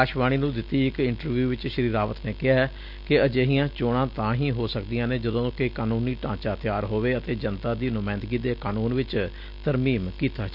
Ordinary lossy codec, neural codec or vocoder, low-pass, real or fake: none; none; 5.4 kHz; real